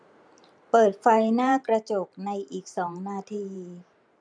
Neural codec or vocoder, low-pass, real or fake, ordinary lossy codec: vocoder, 44.1 kHz, 128 mel bands every 512 samples, BigVGAN v2; 9.9 kHz; fake; none